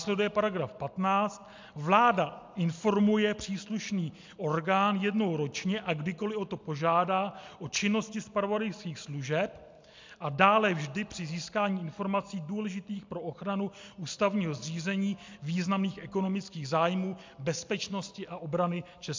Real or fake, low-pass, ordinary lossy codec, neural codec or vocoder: real; 7.2 kHz; MP3, 64 kbps; none